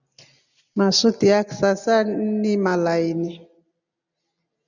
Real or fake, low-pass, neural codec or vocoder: real; 7.2 kHz; none